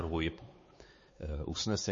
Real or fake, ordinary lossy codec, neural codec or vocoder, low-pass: fake; MP3, 32 kbps; codec, 16 kHz, 4 kbps, X-Codec, WavLM features, trained on Multilingual LibriSpeech; 7.2 kHz